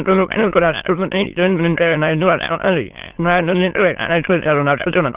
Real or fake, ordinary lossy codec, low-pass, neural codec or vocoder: fake; Opus, 16 kbps; 3.6 kHz; autoencoder, 22.05 kHz, a latent of 192 numbers a frame, VITS, trained on many speakers